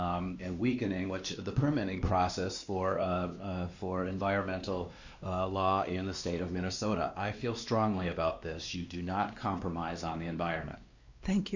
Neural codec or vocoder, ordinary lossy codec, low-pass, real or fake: codec, 16 kHz, 2 kbps, X-Codec, WavLM features, trained on Multilingual LibriSpeech; Opus, 64 kbps; 7.2 kHz; fake